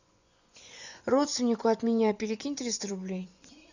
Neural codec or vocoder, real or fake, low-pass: vocoder, 24 kHz, 100 mel bands, Vocos; fake; 7.2 kHz